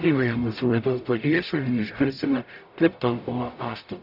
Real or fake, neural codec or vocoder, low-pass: fake; codec, 44.1 kHz, 0.9 kbps, DAC; 5.4 kHz